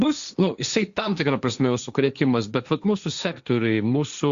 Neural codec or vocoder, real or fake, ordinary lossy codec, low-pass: codec, 16 kHz, 1.1 kbps, Voila-Tokenizer; fake; Opus, 64 kbps; 7.2 kHz